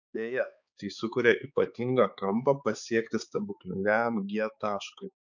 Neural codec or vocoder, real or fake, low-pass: codec, 16 kHz, 4 kbps, X-Codec, HuBERT features, trained on balanced general audio; fake; 7.2 kHz